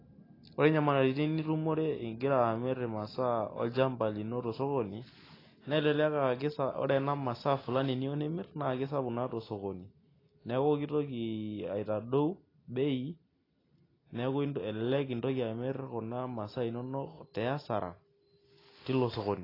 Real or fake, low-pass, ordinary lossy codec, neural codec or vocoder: real; 5.4 kHz; AAC, 24 kbps; none